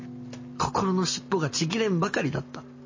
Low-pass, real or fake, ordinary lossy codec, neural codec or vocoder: 7.2 kHz; real; MP3, 32 kbps; none